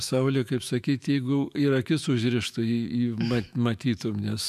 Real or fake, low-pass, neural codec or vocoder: fake; 14.4 kHz; autoencoder, 48 kHz, 128 numbers a frame, DAC-VAE, trained on Japanese speech